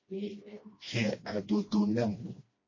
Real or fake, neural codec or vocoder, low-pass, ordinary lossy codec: fake; codec, 16 kHz, 1 kbps, FreqCodec, smaller model; 7.2 kHz; MP3, 32 kbps